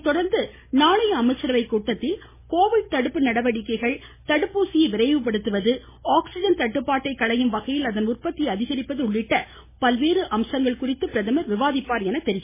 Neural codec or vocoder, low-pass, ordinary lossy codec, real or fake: none; 3.6 kHz; MP3, 16 kbps; real